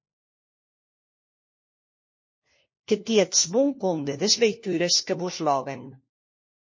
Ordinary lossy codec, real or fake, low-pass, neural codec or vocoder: MP3, 32 kbps; fake; 7.2 kHz; codec, 16 kHz, 1 kbps, FunCodec, trained on LibriTTS, 50 frames a second